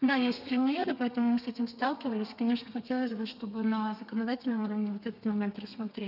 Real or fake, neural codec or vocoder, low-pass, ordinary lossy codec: fake; codec, 32 kHz, 1.9 kbps, SNAC; 5.4 kHz; none